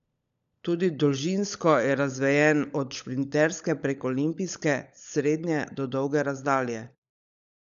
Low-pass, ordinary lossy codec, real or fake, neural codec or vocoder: 7.2 kHz; none; fake; codec, 16 kHz, 16 kbps, FunCodec, trained on LibriTTS, 50 frames a second